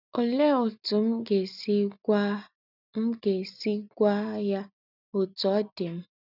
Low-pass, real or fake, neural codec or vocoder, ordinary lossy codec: 5.4 kHz; real; none; none